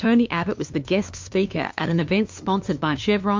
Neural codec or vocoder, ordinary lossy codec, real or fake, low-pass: codec, 16 kHz, 2 kbps, FunCodec, trained on LibriTTS, 25 frames a second; MP3, 48 kbps; fake; 7.2 kHz